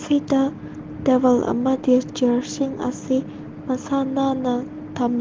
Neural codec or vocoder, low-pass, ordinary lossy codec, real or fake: none; 7.2 kHz; Opus, 16 kbps; real